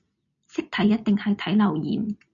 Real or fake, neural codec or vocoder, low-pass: real; none; 7.2 kHz